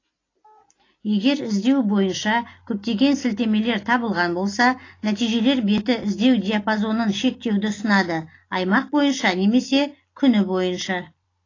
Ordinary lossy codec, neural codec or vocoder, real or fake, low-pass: AAC, 32 kbps; none; real; 7.2 kHz